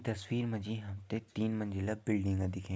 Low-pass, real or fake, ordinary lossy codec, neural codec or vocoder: none; real; none; none